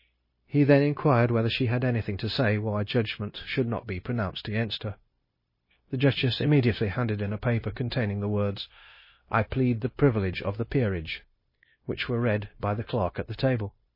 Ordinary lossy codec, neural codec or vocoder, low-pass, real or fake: MP3, 24 kbps; codec, 16 kHz, 0.9 kbps, LongCat-Audio-Codec; 5.4 kHz; fake